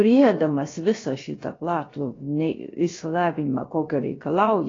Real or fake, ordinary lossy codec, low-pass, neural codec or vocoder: fake; AAC, 32 kbps; 7.2 kHz; codec, 16 kHz, about 1 kbps, DyCAST, with the encoder's durations